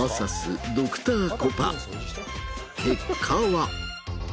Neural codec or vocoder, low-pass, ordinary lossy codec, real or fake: none; none; none; real